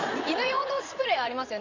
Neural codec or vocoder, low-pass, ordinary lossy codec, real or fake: vocoder, 44.1 kHz, 80 mel bands, Vocos; 7.2 kHz; Opus, 64 kbps; fake